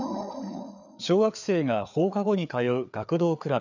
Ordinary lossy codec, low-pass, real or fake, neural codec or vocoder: none; 7.2 kHz; fake; codec, 16 kHz, 4 kbps, FreqCodec, larger model